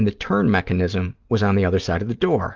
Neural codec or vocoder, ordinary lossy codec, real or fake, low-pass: none; Opus, 32 kbps; real; 7.2 kHz